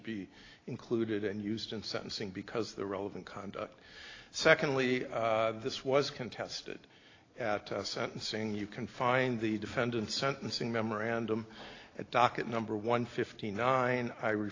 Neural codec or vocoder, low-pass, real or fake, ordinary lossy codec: none; 7.2 kHz; real; AAC, 32 kbps